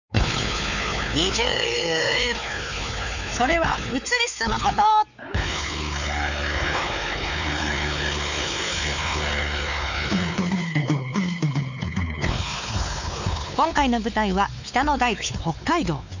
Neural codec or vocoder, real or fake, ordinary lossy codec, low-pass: codec, 16 kHz, 4 kbps, X-Codec, WavLM features, trained on Multilingual LibriSpeech; fake; none; 7.2 kHz